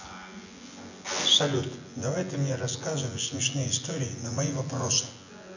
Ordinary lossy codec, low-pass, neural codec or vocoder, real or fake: none; 7.2 kHz; vocoder, 24 kHz, 100 mel bands, Vocos; fake